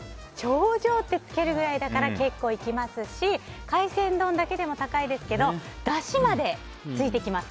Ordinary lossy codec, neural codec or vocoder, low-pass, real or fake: none; none; none; real